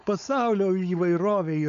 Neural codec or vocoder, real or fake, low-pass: codec, 16 kHz, 4.8 kbps, FACodec; fake; 7.2 kHz